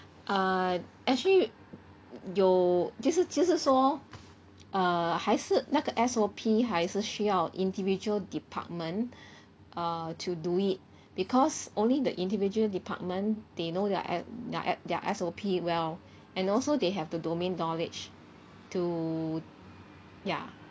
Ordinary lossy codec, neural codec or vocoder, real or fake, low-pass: none; none; real; none